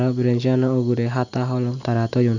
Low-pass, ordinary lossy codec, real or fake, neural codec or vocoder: 7.2 kHz; none; real; none